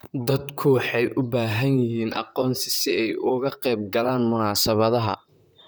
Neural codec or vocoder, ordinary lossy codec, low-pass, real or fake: vocoder, 44.1 kHz, 128 mel bands, Pupu-Vocoder; none; none; fake